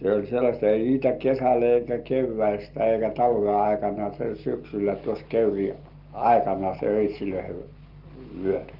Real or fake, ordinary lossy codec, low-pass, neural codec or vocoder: real; Opus, 32 kbps; 5.4 kHz; none